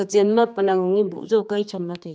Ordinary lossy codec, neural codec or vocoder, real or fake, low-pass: none; codec, 16 kHz, 2 kbps, X-Codec, HuBERT features, trained on general audio; fake; none